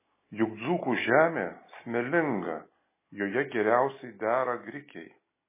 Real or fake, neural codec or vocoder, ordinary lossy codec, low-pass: real; none; MP3, 16 kbps; 3.6 kHz